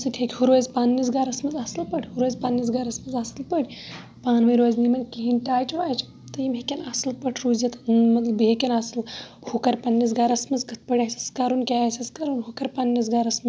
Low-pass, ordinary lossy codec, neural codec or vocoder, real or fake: none; none; none; real